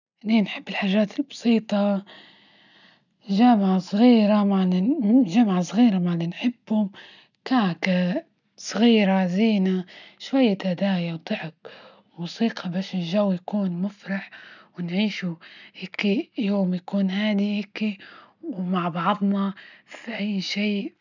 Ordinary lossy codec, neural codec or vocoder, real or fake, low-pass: none; none; real; 7.2 kHz